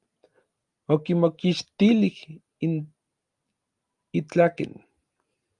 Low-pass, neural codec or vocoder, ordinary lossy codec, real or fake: 10.8 kHz; none; Opus, 32 kbps; real